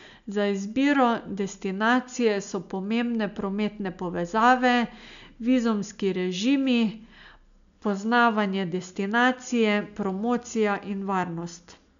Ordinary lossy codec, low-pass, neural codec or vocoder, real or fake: MP3, 96 kbps; 7.2 kHz; none; real